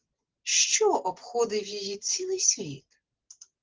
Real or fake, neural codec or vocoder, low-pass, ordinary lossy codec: fake; vocoder, 44.1 kHz, 128 mel bands every 512 samples, BigVGAN v2; 7.2 kHz; Opus, 16 kbps